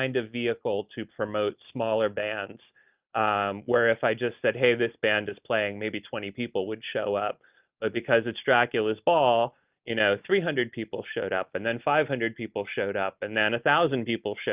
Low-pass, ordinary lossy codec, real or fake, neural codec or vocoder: 3.6 kHz; Opus, 24 kbps; real; none